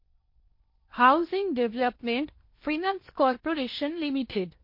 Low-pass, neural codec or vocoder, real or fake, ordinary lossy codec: 5.4 kHz; codec, 16 kHz in and 24 kHz out, 0.8 kbps, FocalCodec, streaming, 65536 codes; fake; MP3, 32 kbps